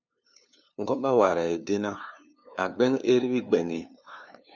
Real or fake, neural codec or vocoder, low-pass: fake; codec, 16 kHz, 2 kbps, FunCodec, trained on LibriTTS, 25 frames a second; 7.2 kHz